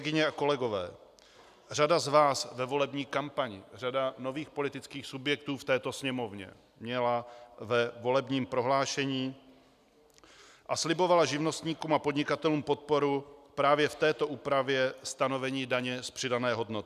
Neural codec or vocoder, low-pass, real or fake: none; 14.4 kHz; real